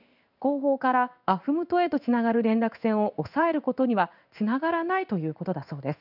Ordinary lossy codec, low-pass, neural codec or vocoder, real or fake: none; 5.4 kHz; codec, 16 kHz in and 24 kHz out, 1 kbps, XY-Tokenizer; fake